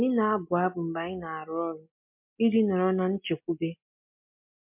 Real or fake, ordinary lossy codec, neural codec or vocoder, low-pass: real; MP3, 32 kbps; none; 3.6 kHz